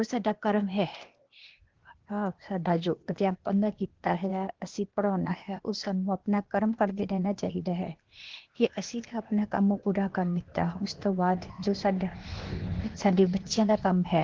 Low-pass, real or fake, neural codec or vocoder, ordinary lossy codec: 7.2 kHz; fake; codec, 16 kHz, 0.8 kbps, ZipCodec; Opus, 16 kbps